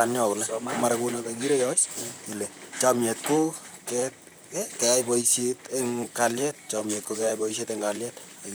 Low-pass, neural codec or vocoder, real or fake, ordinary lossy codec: none; vocoder, 44.1 kHz, 128 mel bands, Pupu-Vocoder; fake; none